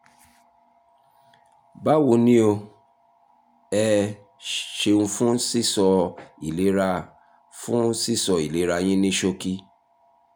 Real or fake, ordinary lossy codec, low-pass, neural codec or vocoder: real; none; none; none